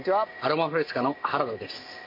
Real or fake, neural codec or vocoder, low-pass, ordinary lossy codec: real; none; 5.4 kHz; MP3, 32 kbps